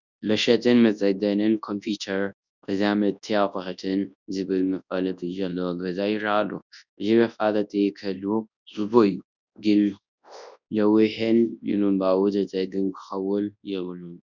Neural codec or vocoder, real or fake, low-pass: codec, 24 kHz, 0.9 kbps, WavTokenizer, large speech release; fake; 7.2 kHz